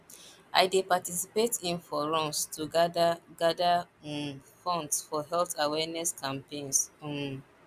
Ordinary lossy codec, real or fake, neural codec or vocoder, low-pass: none; real; none; 14.4 kHz